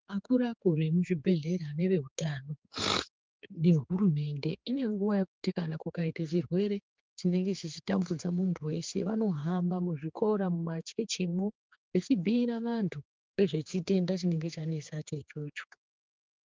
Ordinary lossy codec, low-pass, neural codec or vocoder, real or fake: Opus, 32 kbps; 7.2 kHz; codec, 16 kHz, 4 kbps, X-Codec, HuBERT features, trained on general audio; fake